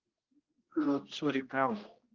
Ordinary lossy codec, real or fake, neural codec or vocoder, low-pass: Opus, 24 kbps; fake; codec, 16 kHz, 1 kbps, X-Codec, HuBERT features, trained on general audio; 7.2 kHz